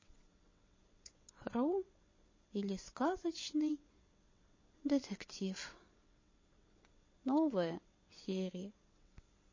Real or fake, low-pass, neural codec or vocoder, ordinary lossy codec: fake; 7.2 kHz; vocoder, 22.05 kHz, 80 mel bands, WaveNeXt; MP3, 32 kbps